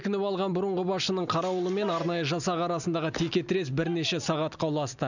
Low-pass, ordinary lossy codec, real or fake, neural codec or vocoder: 7.2 kHz; none; real; none